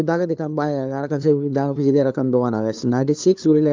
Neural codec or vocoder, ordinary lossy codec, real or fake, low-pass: codec, 16 kHz, 2 kbps, FunCodec, trained on LibriTTS, 25 frames a second; Opus, 24 kbps; fake; 7.2 kHz